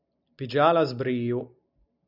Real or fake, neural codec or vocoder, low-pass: real; none; 5.4 kHz